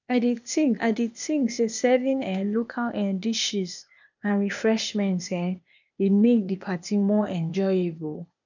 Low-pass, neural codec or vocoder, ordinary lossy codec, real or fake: 7.2 kHz; codec, 16 kHz, 0.8 kbps, ZipCodec; none; fake